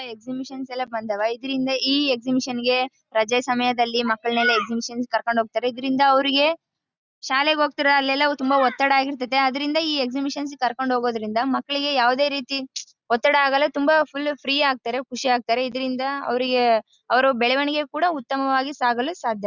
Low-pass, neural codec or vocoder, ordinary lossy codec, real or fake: 7.2 kHz; none; Opus, 64 kbps; real